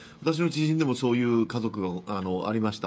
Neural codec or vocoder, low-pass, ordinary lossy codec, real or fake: codec, 16 kHz, 16 kbps, FreqCodec, smaller model; none; none; fake